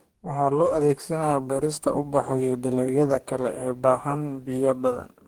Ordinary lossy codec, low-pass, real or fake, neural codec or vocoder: Opus, 24 kbps; 19.8 kHz; fake; codec, 44.1 kHz, 2.6 kbps, DAC